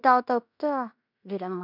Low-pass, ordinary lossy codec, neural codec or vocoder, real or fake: 5.4 kHz; none; codec, 16 kHz in and 24 kHz out, 0.9 kbps, LongCat-Audio-Codec, fine tuned four codebook decoder; fake